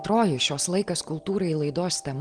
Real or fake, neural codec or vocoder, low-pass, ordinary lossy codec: real; none; 9.9 kHz; Opus, 24 kbps